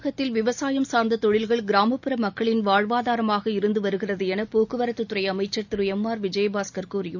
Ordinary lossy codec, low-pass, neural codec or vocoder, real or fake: none; 7.2 kHz; none; real